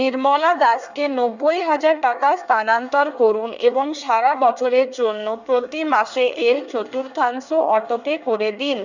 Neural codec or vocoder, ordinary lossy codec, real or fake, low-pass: codec, 24 kHz, 1 kbps, SNAC; none; fake; 7.2 kHz